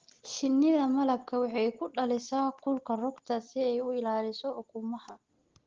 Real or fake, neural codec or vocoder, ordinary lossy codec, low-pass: real; none; Opus, 16 kbps; 7.2 kHz